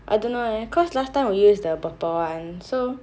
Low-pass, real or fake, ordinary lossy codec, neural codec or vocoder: none; real; none; none